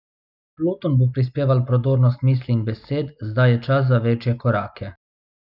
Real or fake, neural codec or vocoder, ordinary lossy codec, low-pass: real; none; none; 5.4 kHz